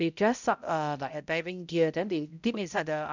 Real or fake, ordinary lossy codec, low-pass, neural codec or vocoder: fake; none; 7.2 kHz; codec, 16 kHz, 0.5 kbps, X-Codec, HuBERT features, trained on balanced general audio